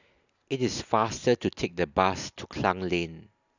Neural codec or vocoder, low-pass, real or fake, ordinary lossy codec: none; 7.2 kHz; real; none